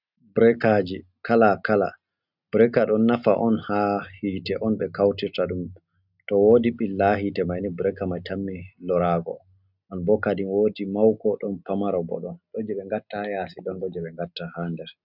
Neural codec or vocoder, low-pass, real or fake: none; 5.4 kHz; real